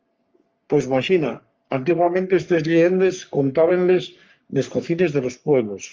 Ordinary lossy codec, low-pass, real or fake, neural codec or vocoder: Opus, 24 kbps; 7.2 kHz; fake; codec, 44.1 kHz, 3.4 kbps, Pupu-Codec